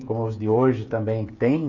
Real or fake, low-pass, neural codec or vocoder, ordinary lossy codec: fake; 7.2 kHz; codec, 16 kHz, 8 kbps, FreqCodec, smaller model; none